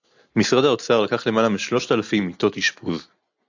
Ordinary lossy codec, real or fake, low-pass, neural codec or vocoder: AAC, 48 kbps; real; 7.2 kHz; none